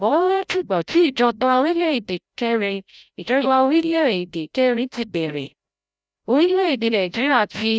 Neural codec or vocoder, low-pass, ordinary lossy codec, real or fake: codec, 16 kHz, 0.5 kbps, FreqCodec, larger model; none; none; fake